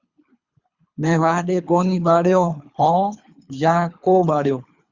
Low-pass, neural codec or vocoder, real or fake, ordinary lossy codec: 7.2 kHz; codec, 24 kHz, 3 kbps, HILCodec; fake; Opus, 64 kbps